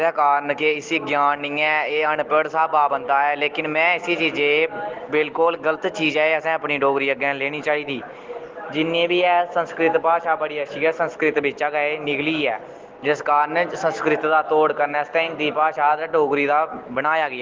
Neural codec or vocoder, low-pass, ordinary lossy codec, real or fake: none; 7.2 kHz; Opus, 16 kbps; real